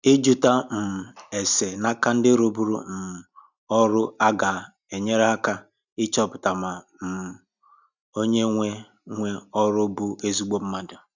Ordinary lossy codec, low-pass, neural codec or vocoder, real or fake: none; 7.2 kHz; none; real